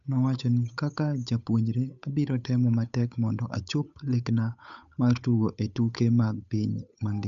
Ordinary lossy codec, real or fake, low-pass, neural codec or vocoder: none; fake; 7.2 kHz; codec, 16 kHz, 8 kbps, FunCodec, trained on Chinese and English, 25 frames a second